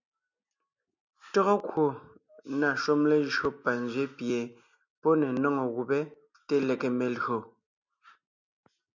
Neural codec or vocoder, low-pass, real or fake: none; 7.2 kHz; real